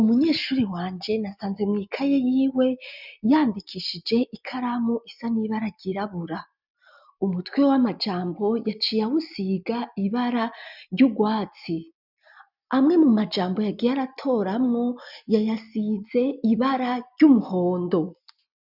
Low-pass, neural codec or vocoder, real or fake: 5.4 kHz; none; real